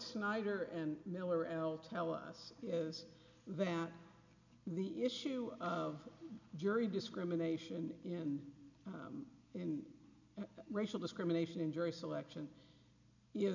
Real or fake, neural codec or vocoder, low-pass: real; none; 7.2 kHz